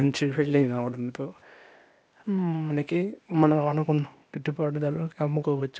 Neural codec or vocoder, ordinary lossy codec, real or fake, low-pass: codec, 16 kHz, 0.8 kbps, ZipCodec; none; fake; none